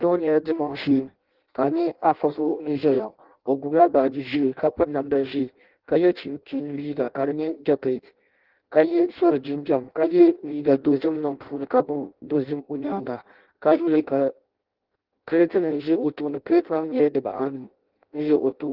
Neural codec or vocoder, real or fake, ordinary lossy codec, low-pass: codec, 16 kHz in and 24 kHz out, 0.6 kbps, FireRedTTS-2 codec; fake; Opus, 16 kbps; 5.4 kHz